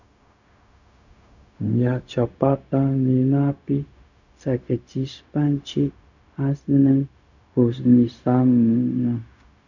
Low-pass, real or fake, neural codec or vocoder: 7.2 kHz; fake; codec, 16 kHz, 0.4 kbps, LongCat-Audio-Codec